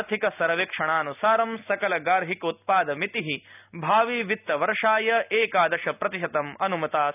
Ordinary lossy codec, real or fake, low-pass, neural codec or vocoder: none; real; 3.6 kHz; none